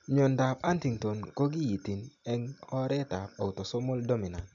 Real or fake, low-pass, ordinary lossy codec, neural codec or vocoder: real; 7.2 kHz; MP3, 64 kbps; none